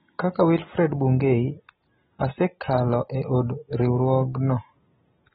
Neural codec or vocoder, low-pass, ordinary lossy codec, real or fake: none; 19.8 kHz; AAC, 16 kbps; real